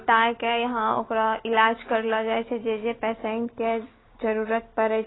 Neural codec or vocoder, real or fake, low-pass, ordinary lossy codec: none; real; 7.2 kHz; AAC, 16 kbps